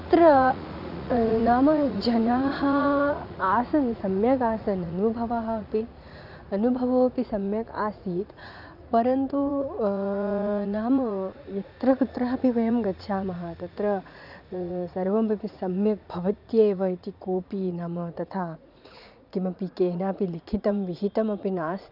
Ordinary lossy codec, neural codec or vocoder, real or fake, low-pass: none; vocoder, 44.1 kHz, 80 mel bands, Vocos; fake; 5.4 kHz